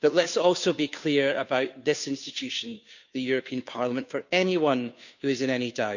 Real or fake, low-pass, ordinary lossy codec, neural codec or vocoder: fake; 7.2 kHz; none; codec, 16 kHz, 2 kbps, FunCodec, trained on Chinese and English, 25 frames a second